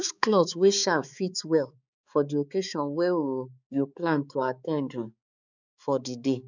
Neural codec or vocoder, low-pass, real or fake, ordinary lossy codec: codec, 16 kHz, 4 kbps, X-Codec, HuBERT features, trained on balanced general audio; 7.2 kHz; fake; none